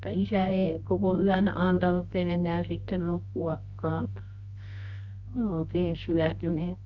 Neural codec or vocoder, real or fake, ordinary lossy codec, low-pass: codec, 24 kHz, 0.9 kbps, WavTokenizer, medium music audio release; fake; Opus, 64 kbps; 7.2 kHz